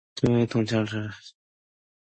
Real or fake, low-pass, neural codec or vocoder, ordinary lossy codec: real; 9.9 kHz; none; MP3, 32 kbps